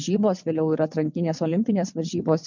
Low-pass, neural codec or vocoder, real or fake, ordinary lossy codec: 7.2 kHz; vocoder, 22.05 kHz, 80 mel bands, WaveNeXt; fake; MP3, 64 kbps